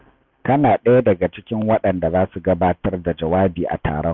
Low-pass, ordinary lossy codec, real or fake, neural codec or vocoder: 7.2 kHz; none; real; none